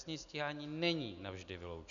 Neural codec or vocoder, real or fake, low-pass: none; real; 7.2 kHz